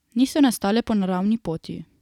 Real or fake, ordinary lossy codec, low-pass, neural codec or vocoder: real; none; 19.8 kHz; none